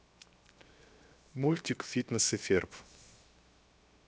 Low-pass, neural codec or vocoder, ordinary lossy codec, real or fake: none; codec, 16 kHz, 0.7 kbps, FocalCodec; none; fake